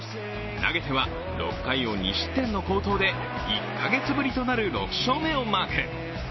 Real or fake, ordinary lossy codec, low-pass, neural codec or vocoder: real; MP3, 24 kbps; 7.2 kHz; none